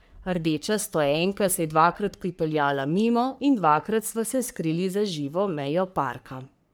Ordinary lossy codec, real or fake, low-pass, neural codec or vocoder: none; fake; none; codec, 44.1 kHz, 3.4 kbps, Pupu-Codec